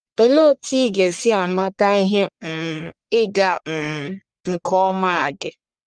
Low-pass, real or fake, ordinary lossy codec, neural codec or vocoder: 9.9 kHz; fake; Opus, 32 kbps; codec, 44.1 kHz, 1.7 kbps, Pupu-Codec